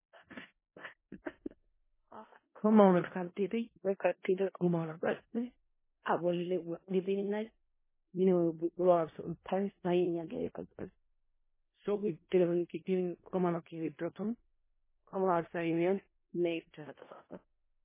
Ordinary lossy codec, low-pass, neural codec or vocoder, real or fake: MP3, 16 kbps; 3.6 kHz; codec, 16 kHz in and 24 kHz out, 0.4 kbps, LongCat-Audio-Codec, four codebook decoder; fake